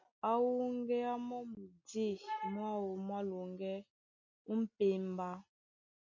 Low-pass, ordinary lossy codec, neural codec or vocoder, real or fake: 7.2 kHz; MP3, 48 kbps; none; real